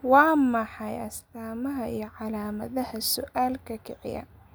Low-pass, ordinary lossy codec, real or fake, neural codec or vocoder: none; none; real; none